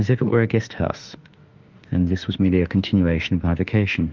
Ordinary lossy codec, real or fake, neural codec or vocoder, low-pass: Opus, 24 kbps; fake; autoencoder, 48 kHz, 32 numbers a frame, DAC-VAE, trained on Japanese speech; 7.2 kHz